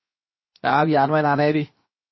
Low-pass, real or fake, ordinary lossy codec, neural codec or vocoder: 7.2 kHz; fake; MP3, 24 kbps; codec, 16 kHz, 0.7 kbps, FocalCodec